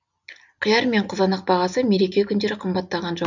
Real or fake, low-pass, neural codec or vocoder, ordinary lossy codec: real; 7.2 kHz; none; none